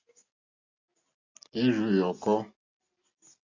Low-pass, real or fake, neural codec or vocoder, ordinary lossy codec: 7.2 kHz; real; none; AAC, 48 kbps